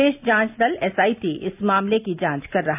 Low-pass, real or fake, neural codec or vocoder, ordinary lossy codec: 3.6 kHz; real; none; AAC, 32 kbps